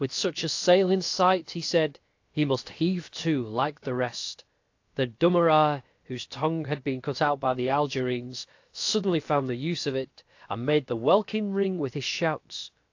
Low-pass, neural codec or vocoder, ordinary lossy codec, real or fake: 7.2 kHz; codec, 16 kHz, about 1 kbps, DyCAST, with the encoder's durations; AAC, 48 kbps; fake